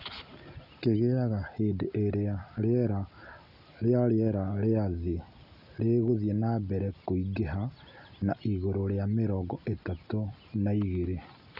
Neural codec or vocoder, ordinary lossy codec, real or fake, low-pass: none; none; real; 5.4 kHz